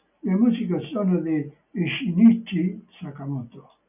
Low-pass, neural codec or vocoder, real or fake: 3.6 kHz; none; real